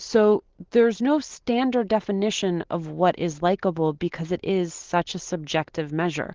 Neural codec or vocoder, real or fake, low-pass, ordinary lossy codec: none; real; 7.2 kHz; Opus, 16 kbps